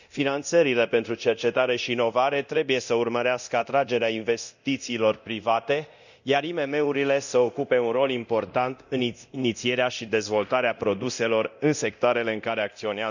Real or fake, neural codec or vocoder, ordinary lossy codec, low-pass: fake; codec, 24 kHz, 0.9 kbps, DualCodec; none; 7.2 kHz